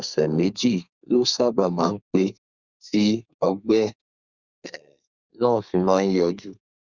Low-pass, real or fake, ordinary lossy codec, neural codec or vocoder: 7.2 kHz; fake; Opus, 64 kbps; codec, 32 kHz, 1.9 kbps, SNAC